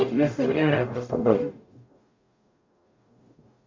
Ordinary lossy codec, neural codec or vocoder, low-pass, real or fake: MP3, 48 kbps; codec, 44.1 kHz, 0.9 kbps, DAC; 7.2 kHz; fake